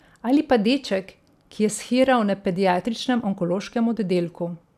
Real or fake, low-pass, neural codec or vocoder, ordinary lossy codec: real; 14.4 kHz; none; none